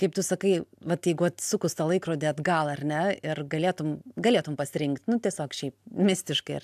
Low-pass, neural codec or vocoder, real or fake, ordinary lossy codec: 14.4 kHz; none; real; AAC, 96 kbps